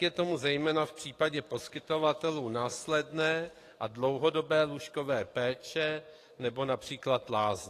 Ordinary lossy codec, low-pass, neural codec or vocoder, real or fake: AAC, 48 kbps; 14.4 kHz; codec, 44.1 kHz, 7.8 kbps, DAC; fake